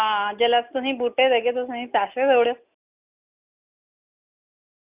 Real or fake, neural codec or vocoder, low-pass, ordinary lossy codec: real; none; 3.6 kHz; Opus, 64 kbps